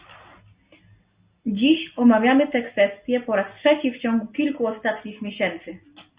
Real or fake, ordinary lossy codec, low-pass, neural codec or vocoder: real; Opus, 64 kbps; 3.6 kHz; none